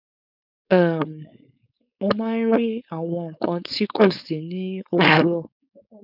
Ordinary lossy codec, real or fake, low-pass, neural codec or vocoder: none; fake; 5.4 kHz; codec, 16 kHz, 4.8 kbps, FACodec